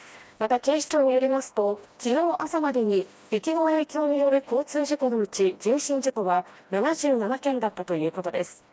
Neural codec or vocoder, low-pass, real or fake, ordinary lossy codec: codec, 16 kHz, 1 kbps, FreqCodec, smaller model; none; fake; none